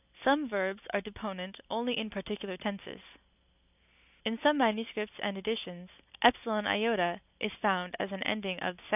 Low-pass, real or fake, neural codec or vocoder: 3.6 kHz; real; none